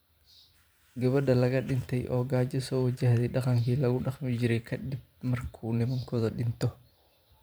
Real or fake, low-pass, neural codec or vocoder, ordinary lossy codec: fake; none; vocoder, 44.1 kHz, 128 mel bands every 512 samples, BigVGAN v2; none